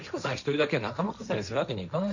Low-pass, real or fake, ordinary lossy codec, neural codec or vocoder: 7.2 kHz; fake; none; codec, 16 kHz, 1.1 kbps, Voila-Tokenizer